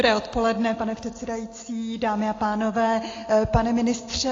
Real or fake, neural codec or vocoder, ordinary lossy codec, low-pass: real; none; AAC, 32 kbps; 7.2 kHz